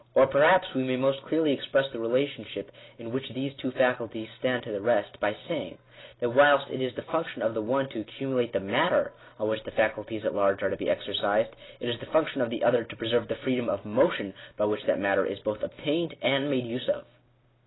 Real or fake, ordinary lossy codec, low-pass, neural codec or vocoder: real; AAC, 16 kbps; 7.2 kHz; none